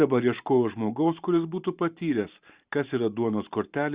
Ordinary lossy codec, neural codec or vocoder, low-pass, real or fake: Opus, 64 kbps; vocoder, 44.1 kHz, 128 mel bands every 512 samples, BigVGAN v2; 3.6 kHz; fake